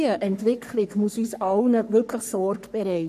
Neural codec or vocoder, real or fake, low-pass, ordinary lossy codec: codec, 44.1 kHz, 3.4 kbps, Pupu-Codec; fake; 14.4 kHz; AAC, 96 kbps